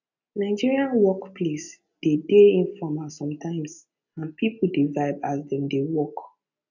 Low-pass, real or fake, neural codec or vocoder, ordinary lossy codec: 7.2 kHz; real; none; none